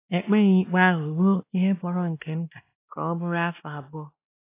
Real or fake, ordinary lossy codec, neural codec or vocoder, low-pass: fake; AAC, 24 kbps; codec, 16 kHz, 1 kbps, X-Codec, WavLM features, trained on Multilingual LibriSpeech; 3.6 kHz